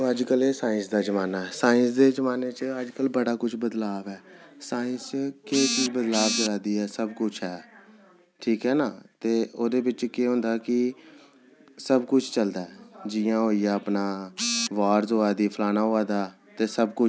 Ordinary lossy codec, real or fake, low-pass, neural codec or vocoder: none; real; none; none